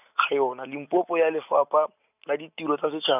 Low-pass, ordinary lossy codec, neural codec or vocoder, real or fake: 3.6 kHz; none; none; real